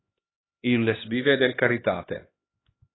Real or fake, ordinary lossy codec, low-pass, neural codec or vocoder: fake; AAC, 16 kbps; 7.2 kHz; codec, 16 kHz, 1 kbps, X-Codec, HuBERT features, trained on LibriSpeech